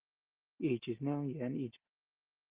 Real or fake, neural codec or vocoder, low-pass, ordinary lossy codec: real; none; 3.6 kHz; Opus, 16 kbps